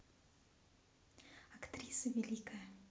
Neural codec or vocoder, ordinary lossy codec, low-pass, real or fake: none; none; none; real